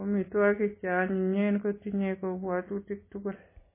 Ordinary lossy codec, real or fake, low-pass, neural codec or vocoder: MP3, 16 kbps; real; 3.6 kHz; none